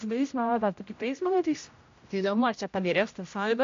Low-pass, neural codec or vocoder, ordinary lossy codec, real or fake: 7.2 kHz; codec, 16 kHz, 0.5 kbps, X-Codec, HuBERT features, trained on general audio; AAC, 48 kbps; fake